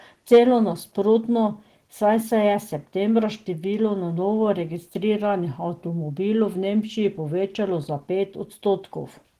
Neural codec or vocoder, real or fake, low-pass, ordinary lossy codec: none; real; 14.4 kHz; Opus, 16 kbps